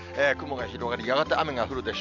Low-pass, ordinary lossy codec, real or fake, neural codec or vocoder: 7.2 kHz; none; real; none